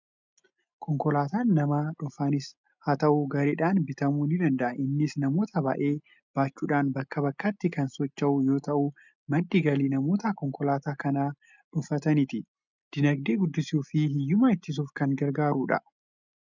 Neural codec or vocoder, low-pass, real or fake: none; 7.2 kHz; real